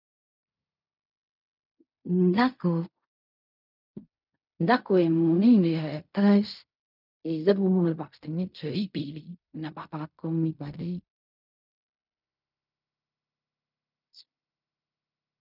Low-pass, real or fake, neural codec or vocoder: 5.4 kHz; fake; codec, 16 kHz in and 24 kHz out, 0.4 kbps, LongCat-Audio-Codec, fine tuned four codebook decoder